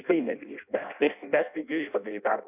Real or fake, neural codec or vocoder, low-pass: fake; codec, 16 kHz in and 24 kHz out, 0.6 kbps, FireRedTTS-2 codec; 3.6 kHz